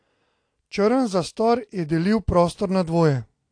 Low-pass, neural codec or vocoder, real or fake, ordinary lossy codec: 9.9 kHz; none; real; AAC, 48 kbps